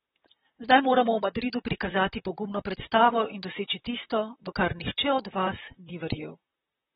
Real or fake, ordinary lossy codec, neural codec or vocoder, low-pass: fake; AAC, 16 kbps; vocoder, 44.1 kHz, 128 mel bands, Pupu-Vocoder; 19.8 kHz